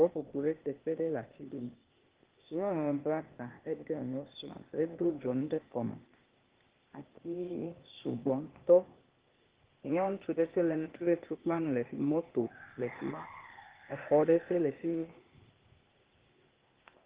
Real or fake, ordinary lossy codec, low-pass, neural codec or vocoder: fake; Opus, 16 kbps; 3.6 kHz; codec, 16 kHz, 0.8 kbps, ZipCodec